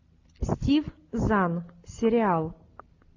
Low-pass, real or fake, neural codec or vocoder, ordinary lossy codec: 7.2 kHz; real; none; AAC, 48 kbps